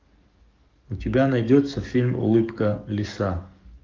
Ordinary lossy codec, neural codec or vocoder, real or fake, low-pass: Opus, 24 kbps; codec, 44.1 kHz, 7.8 kbps, DAC; fake; 7.2 kHz